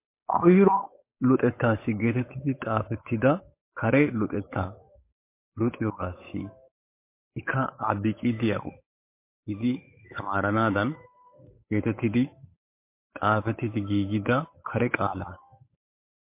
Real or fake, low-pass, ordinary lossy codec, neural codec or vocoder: fake; 3.6 kHz; MP3, 24 kbps; codec, 16 kHz, 8 kbps, FunCodec, trained on Chinese and English, 25 frames a second